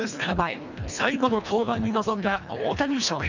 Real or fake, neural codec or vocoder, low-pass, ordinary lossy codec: fake; codec, 24 kHz, 1.5 kbps, HILCodec; 7.2 kHz; none